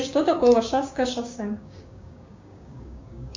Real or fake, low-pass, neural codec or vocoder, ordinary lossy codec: fake; 7.2 kHz; codec, 16 kHz, 6 kbps, DAC; MP3, 48 kbps